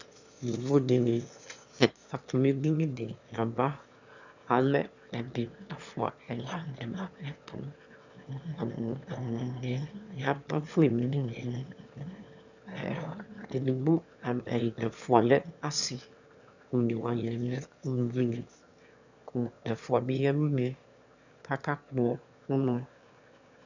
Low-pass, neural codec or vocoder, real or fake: 7.2 kHz; autoencoder, 22.05 kHz, a latent of 192 numbers a frame, VITS, trained on one speaker; fake